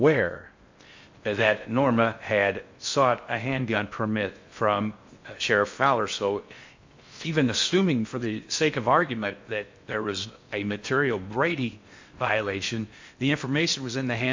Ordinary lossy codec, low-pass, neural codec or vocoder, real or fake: MP3, 48 kbps; 7.2 kHz; codec, 16 kHz in and 24 kHz out, 0.6 kbps, FocalCodec, streaming, 4096 codes; fake